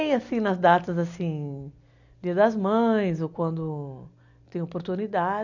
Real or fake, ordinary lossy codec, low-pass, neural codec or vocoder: real; none; 7.2 kHz; none